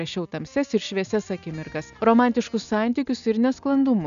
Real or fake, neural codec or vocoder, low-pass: real; none; 7.2 kHz